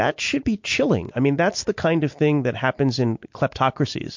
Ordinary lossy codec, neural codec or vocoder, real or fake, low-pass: MP3, 48 kbps; none; real; 7.2 kHz